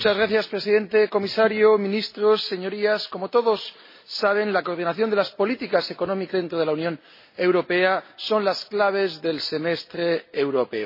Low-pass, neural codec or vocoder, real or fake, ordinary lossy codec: 5.4 kHz; none; real; MP3, 24 kbps